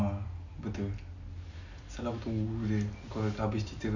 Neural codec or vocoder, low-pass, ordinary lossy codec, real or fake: none; 7.2 kHz; none; real